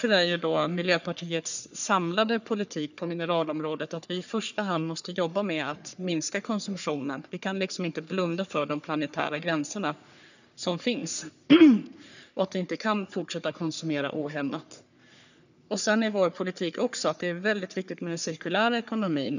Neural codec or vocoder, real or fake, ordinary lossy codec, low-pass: codec, 44.1 kHz, 3.4 kbps, Pupu-Codec; fake; none; 7.2 kHz